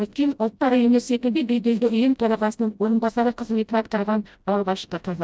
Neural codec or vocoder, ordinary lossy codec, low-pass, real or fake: codec, 16 kHz, 0.5 kbps, FreqCodec, smaller model; none; none; fake